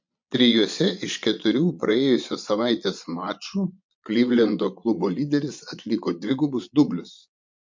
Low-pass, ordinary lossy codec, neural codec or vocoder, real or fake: 7.2 kHz; MP3, 64 kbps; vocoder, 22.05 kHz, 80 mel bands, Vocos; fake